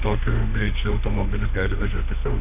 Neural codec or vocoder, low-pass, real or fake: autoencoder, 48 kHz, 32 numbers a frame, DAC-VAE, trained on Japanese speech; 3.6 kHz; fake